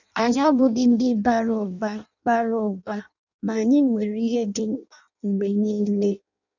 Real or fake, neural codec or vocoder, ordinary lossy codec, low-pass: fake; codec, 16 kHz in and 24 kHz out, 0.6 kbps, FireRedTTS-2 codec; none; 7.2 kHz